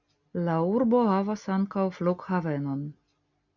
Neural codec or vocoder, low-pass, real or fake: none; 7.2 kHz; real